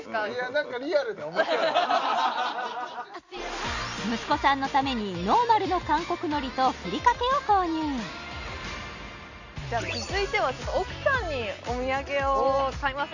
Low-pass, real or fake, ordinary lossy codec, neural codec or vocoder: 7.2 kHz; real; none; none